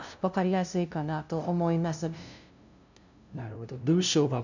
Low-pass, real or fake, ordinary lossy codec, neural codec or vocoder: 7.2 kHz; fake; none; codec, 16 kHz, 0.5 kbps, FunCodec, trained on LibriTTS, 25 frames a second